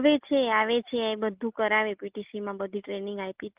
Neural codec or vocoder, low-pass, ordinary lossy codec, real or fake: none; 3.6 kHz; Opus, 32 kbps; real